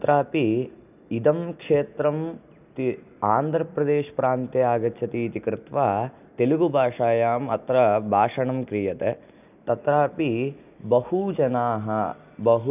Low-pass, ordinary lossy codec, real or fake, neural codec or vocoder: 3.6 kHz; none; real; none